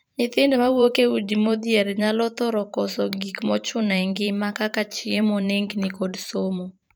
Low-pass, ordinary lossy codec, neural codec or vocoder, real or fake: none; none; vocoder, 44.1 kHz, 128 mel bands every 512 samples, BigVGAN v2; fake